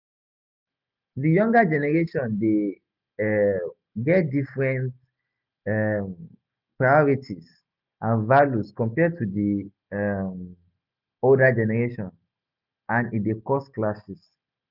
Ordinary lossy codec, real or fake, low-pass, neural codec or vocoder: none; real; 5.4 kHz; none